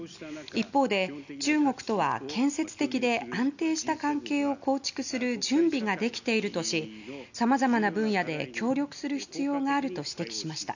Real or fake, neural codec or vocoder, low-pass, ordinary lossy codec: real; none; 7.2 kHz; none